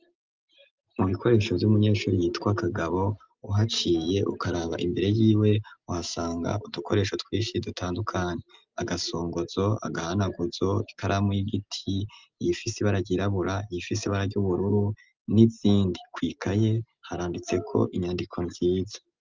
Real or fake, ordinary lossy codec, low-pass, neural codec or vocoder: real; Opus, 24 kbps; 7.2 kHz; none